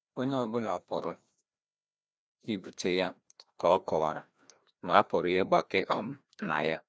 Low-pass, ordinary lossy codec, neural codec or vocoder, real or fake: none; none; codec, 16 kHz, 1 kbps, FreqCodec, larger model; fake